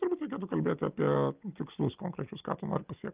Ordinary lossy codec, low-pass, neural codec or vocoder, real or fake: Opus, 32 kbps; 3.6 kHz; none; real